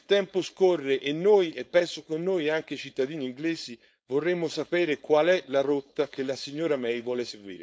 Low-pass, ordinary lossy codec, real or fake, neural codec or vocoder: none; none; fake; codec, 16 kHz, 4.8 kbps, FACodec